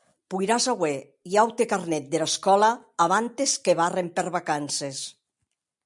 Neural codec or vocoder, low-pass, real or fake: none; 10.8 kHz; real